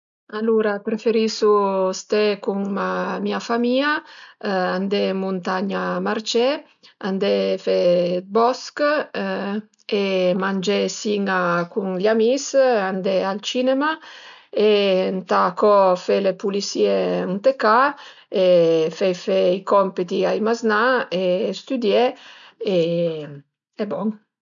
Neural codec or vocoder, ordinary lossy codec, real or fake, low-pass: none; none; real; 7.2 kHz